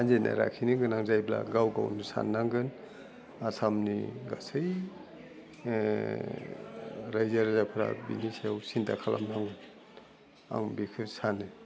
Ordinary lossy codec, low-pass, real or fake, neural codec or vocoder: none; none; real; none